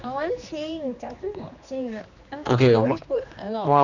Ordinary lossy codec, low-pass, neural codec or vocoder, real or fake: none; 7.2 kHz; codec, 16 kHz, 2 kbps, X-Codec, HuBERT features, trained on general audio; fake